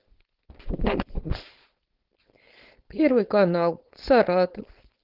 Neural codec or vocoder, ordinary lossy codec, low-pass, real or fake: codec, 16 kHz, 4.8 kbps, FACodec; Opus, 16 kbps; 5.4 kHz; fake